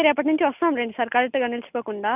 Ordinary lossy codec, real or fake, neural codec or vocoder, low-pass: none; real; none; 3.6 kHz